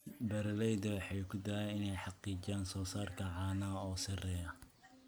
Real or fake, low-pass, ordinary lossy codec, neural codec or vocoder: real; none; none; none